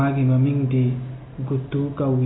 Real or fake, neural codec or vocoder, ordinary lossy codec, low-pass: real; none; AAC, 16 kbps; 7.2 kHz